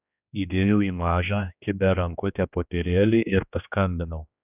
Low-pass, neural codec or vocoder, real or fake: 3.6 kHz; codec, 16 kHz, 2 kbps, X-Codec, HuBERT features, trained on general audio; fake